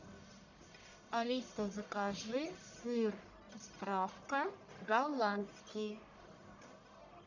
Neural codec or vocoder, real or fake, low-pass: codec, 44.1 kHz, 1.7 kbps, Pupu-Codec; fake; 7.2 kHz